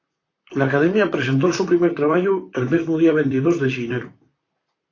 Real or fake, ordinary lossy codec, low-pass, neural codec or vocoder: fake; AAC, 32 kbps; 7.2 kHz; vocoder, 44.1 kHz, 128 mel bands, Pupu-Vocoder